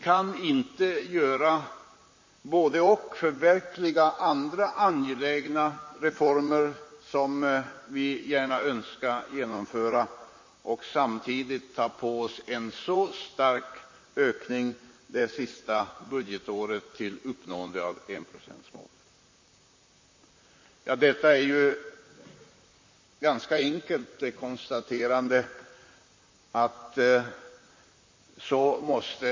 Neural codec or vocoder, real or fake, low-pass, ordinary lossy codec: vocoder, 44.1 kHz, 128 mel bands, Pupu-Vocoder; fake; 7.2 kHz; MP3, 32 kbps